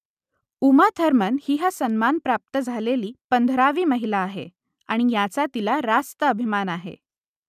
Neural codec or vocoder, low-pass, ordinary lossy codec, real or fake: none; 14.4 kHz; none; real